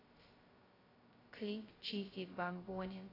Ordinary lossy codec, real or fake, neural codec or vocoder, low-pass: AAC, 24 kbps; fake; codec, 16 kHz, 0.2 kbps, FocalCodec; 5.4 kHz